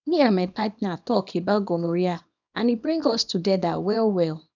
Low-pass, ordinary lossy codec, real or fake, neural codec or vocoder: 7.2 kHz; none; fake; codec, 24 kHz, 0.9 kbps, WavTokenizer, small release